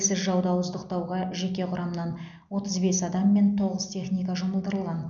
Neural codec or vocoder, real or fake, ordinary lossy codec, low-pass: none; real; none; 7.2 kHz